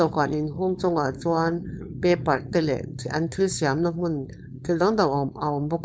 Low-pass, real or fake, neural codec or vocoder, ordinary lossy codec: none; fake; codec, 16 kHz, 4.8 kbps, FACodec; none